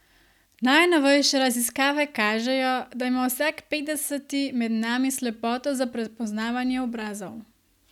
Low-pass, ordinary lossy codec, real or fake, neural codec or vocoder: 19.8 kHz; none; real; none